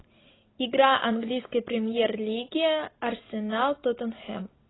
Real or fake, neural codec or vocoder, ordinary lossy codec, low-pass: real; none; AAC, 16 kbps; 7.2 kHz